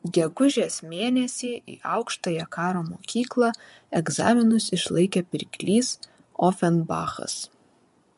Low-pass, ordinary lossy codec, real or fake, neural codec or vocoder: 10.8 kHz; MP3, 64 kbps; fake; vocoder, 24 kHz, 100 mel bands, Vocos